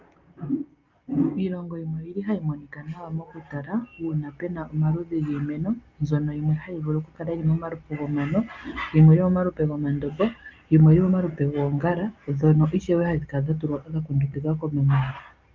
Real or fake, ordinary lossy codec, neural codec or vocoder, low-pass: real; Opus, 32 kbps; none; 7.2 kHz